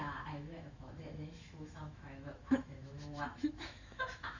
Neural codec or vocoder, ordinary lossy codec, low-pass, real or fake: autoencoder, 48 kHz, 128 numbers a frame, DAC-VAE, trained on Japanese speech; Opus, 64 kbps; 7.2 kHz; fake